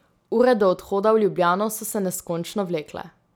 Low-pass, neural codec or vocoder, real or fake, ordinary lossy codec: none; none; real; none